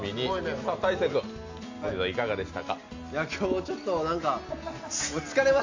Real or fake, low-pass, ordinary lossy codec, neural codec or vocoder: real; 7.2 kHz; AAC, 48 kbps; none